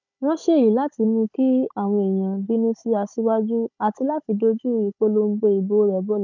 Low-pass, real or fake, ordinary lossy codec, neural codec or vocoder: 7.2 kHz; fake; none; codec, 16 kHz, 16 kbps, FunCodec, trained on Chinese and English, 50 frames a second